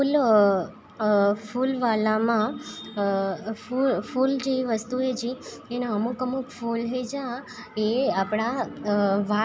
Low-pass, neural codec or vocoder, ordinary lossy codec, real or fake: none; none; none; real